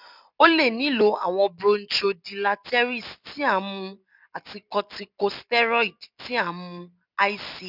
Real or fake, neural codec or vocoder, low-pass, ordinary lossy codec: real; none; 5.4 kHz; none